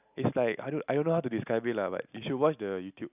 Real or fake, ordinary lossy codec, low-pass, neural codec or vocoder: real; none; 3.6 kHz; none